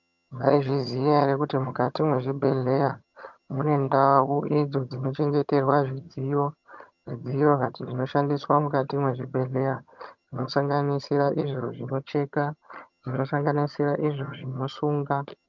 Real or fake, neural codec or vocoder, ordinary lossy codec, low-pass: fake; vocoder, 22.05 kHz, 80 mel bands, HiFi-GAN; MP3, 64 kbps; 7.2 kHz